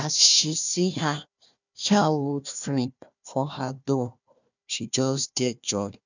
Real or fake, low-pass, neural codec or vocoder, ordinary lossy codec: fake; 7.2 kHz; codec, 16 kHz, 1 kbps, FunCodec, trained on Chinese and English, 50 frames a second; none